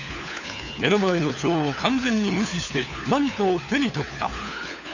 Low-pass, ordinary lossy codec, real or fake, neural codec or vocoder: 7.2 kHz; none; fake; codec, 16 kHz, 4 kbps, FunCodec, trained on LibriTTS, 50 frames a second